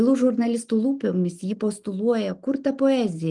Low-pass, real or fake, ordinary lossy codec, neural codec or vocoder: 10.8 kHz; real; Opus, 24 kbps; none